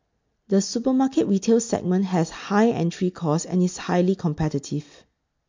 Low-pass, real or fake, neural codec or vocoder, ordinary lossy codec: 7.2 kHz; real; none; MP3, 48 kbps